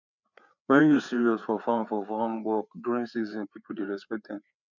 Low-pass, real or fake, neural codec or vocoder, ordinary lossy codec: 7.2 kHz; fake; codec, 16 kHz, 4 kbps, FreqCodec, larger model; none